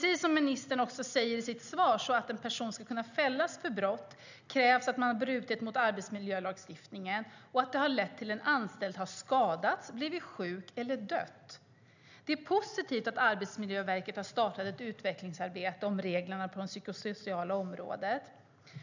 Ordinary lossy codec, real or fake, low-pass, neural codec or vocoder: none; real; 7.2 kHz; none